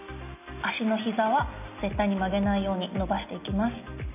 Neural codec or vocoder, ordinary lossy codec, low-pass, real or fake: none; none; 3.6 kHz; real